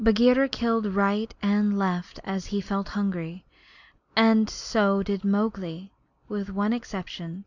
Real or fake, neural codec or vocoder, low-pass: real; none; 7.2 kHz